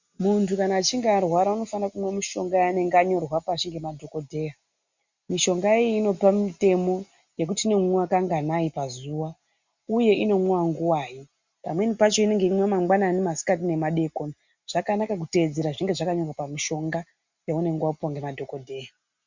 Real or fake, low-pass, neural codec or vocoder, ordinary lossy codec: real; 7.2 kHz; none; Opus, 64 kbps